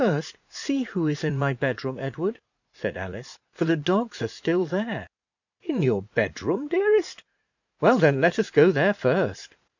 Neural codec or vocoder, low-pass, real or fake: vocoder, 44.1 kHz, 80 mel bands, Vocos; 7.2 kHz; fake